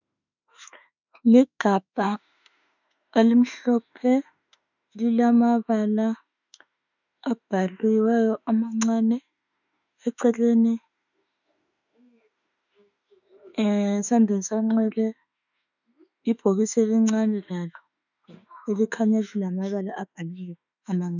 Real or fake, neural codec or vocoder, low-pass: fake; autoencoder, 48 kHz, 32 numbers a frame, DAC-VAE, trained on Japanese speech; 7.2 kHz